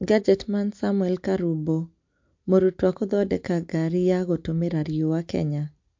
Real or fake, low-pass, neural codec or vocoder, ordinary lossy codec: real; 7.2 kHz; none; MP3, 48 kbps